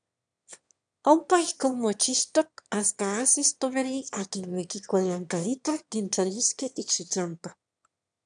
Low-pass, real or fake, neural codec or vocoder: 9.9 kHz; fake; autoencoder, 22.05 kHz, a latent of 192 numbers a frame, VITS, trained on one speaker